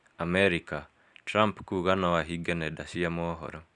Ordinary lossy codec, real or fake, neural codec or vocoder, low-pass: none; real; none; 10.8 kHz